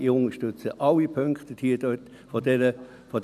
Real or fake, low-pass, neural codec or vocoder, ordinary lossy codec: real; 14.4 kHz; none; none